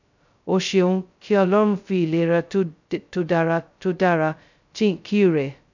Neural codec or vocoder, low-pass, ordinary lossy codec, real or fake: codec, 16 kHz, 0.2 kbps, FocalCodec; 7.2 kHz; none; fake